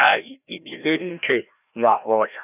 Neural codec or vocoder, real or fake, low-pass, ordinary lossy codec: codec, 16 kHz, 1 kbps, FreqCodec, larger model; fake; 3.6 kHz; none